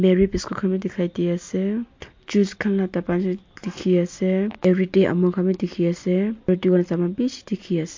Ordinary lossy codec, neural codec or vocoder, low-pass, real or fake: MP3, 48 kbps; none; 7.2 kHz; real